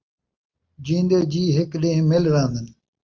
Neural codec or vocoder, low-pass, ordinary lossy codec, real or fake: none; 7.2 kHz; Opus, 24 kbps; real